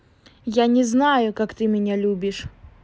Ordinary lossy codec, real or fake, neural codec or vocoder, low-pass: none; real; none; none